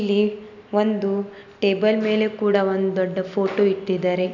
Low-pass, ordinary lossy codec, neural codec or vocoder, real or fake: 7.2 kHz; none; none; real